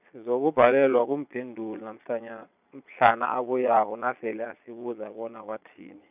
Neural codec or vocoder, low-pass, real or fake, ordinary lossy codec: vocoder, 22.05 kHz, 80 mel bands, Vocos; 3.6 kHz; fake; none